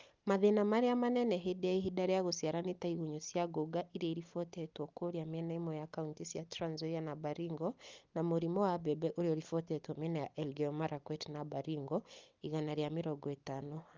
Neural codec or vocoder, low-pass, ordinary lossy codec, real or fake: none; 7.2 kHz; Opus, 32 kbps; real